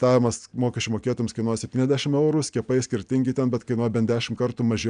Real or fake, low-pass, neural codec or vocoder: real; 9.9 kHz; none